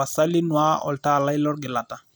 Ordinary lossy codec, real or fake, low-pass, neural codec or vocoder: none; real; none; none